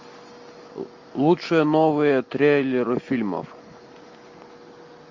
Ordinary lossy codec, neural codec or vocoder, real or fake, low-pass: MP3, 48 kbps; none; real; 7.2 kHz